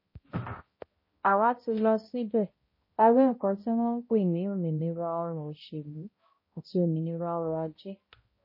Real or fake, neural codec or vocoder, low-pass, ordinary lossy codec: fake; codec, 16 kHz, 0.5 kbps, X-Codec, HuBERT features, trained on balanced general audio; 5.4 kHz; MP3, 24 kbps